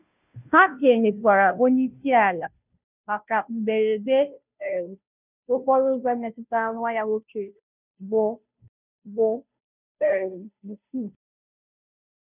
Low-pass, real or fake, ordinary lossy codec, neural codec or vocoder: 3.6 kHz; fake; none; codec, 16 kHz, 0.5 kbps, FunCodec, trained on Chinese and English, 25 frames a second